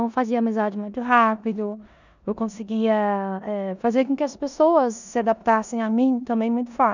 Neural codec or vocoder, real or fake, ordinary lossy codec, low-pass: codec, 16 kHz in and 24 kHz out, 0.9 kbps, LongCat-Audio-Codec, four codebook decoder; fake; none; 7.2 kHz